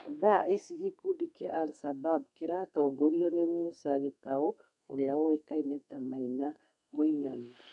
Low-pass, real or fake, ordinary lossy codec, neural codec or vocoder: 10.8 kHz; fake; none; codec, 32 kHz, 1.9 kbps, SNAC